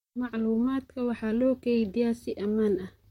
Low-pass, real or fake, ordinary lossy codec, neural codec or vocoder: 19.8 kHz; fake; MP3, 64 kbps; vocoder, 44.1 kHz, 128 mel bands, Pupu-Vocoder